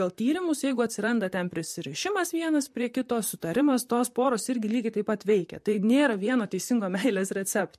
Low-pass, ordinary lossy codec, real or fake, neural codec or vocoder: 14.4 kHz; MP3, 64 kbps; fake; vocoder, 44.1 kHz, 128 mel bands, Pupu-Vocoder